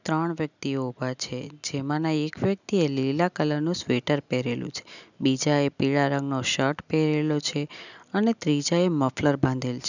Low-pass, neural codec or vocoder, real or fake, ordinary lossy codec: 7.2 kHz; none; real; none